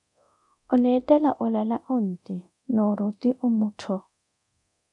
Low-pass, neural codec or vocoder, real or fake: 10.8 kHz; codec, 24 kHz, 0.9 kbps, DualCodec; fake